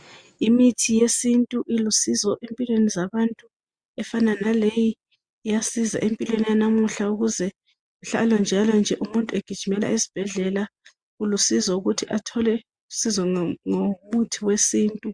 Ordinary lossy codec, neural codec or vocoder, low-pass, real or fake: Opus, 64 kbps; none; 9.9 kHz; real